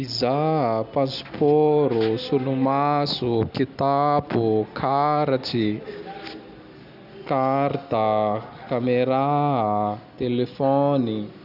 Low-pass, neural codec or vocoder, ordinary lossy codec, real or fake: 5.4 kHz; none; none; real